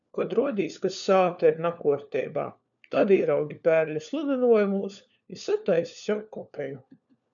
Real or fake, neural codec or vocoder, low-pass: fake; codec, 16 kHz, 4 kbps, FunCodec, trained on LibriTTS, 50 frames a second; 7.2 kHz